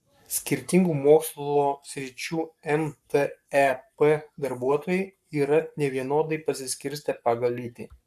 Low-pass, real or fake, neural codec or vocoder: 14.4 kHz; fake; codec, 44.1 kHz, 7.8 kbps, Pupu-Codec